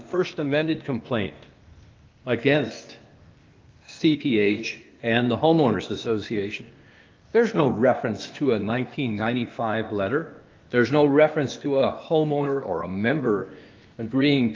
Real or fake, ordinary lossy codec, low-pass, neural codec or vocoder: fake; Opus, 32 kbps; 7.2 kHz; codec, 16 kHz, 0.8 kbps, ZipCodec